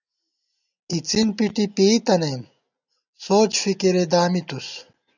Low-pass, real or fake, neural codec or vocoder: 7.2 kHz; real; none